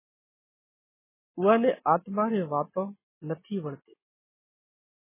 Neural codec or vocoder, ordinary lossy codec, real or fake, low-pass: none; MP3, 16 kbps; real; 3.6 kHz